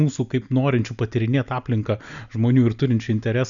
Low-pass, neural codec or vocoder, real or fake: 7.2 kHz; none; real